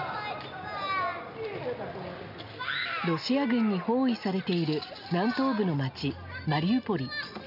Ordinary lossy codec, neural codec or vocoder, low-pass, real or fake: none; none; 5.4 kHz; real